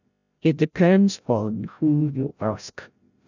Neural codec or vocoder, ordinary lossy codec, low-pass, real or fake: codec, 16 kHz, 0.5 kbps, FreqCodec, larger model; none; 7.2 kHz; fake